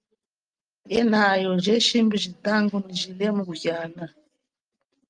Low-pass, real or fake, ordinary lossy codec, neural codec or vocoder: 9.9 kHz; real; Opus, 32 kbps; none